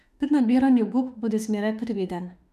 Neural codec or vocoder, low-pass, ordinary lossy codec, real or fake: autoencoder, 48 kHz, 32 numbers a frame, DAC-VAE, trained on Japanese speech; 14.4 kHz; none; fake